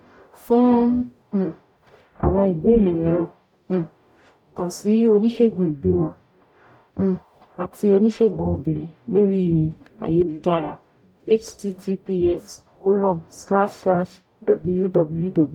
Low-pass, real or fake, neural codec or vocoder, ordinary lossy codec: 19.8 kHz; fake; codec, 44.1 kHz, 0.9 kbps, DAC; none